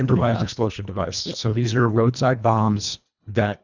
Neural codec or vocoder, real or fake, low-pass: codec, 24 kHz, 1.5 kbps, HILCodec; fake; 7.2 kHz